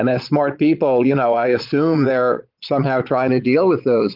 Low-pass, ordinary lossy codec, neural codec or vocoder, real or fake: 5.4 kHz; Opus, 32 kbps; vocoder, 22.05 kHz, 80 mel bands, Vocos; fake